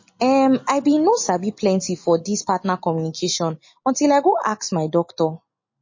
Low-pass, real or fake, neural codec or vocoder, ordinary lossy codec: 7.2 kHz; real; none; MP3, 32 kbps